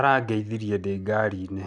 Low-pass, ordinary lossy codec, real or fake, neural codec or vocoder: 9.9 kHz; none; real; none